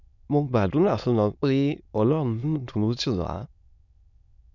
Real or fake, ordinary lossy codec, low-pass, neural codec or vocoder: fake; none; 7.2 kHz; autoencoder, 22.05 kHz, a latent of 192 numbers a frame, VITS, trained on many speakers